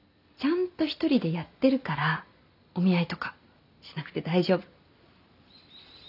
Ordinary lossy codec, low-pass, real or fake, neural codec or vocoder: none; 5.4 kHz; real; none